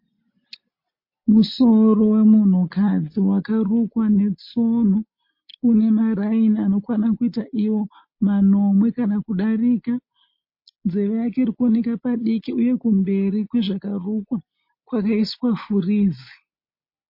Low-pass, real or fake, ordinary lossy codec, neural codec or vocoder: 5.4 kHz; real; MP3, 32 kbps; none